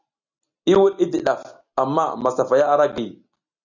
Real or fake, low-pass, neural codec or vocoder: real; 7.2 kHz; none